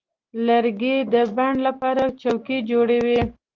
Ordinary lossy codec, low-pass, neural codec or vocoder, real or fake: Opus, 16 kbps; 7.2 kHz; none; real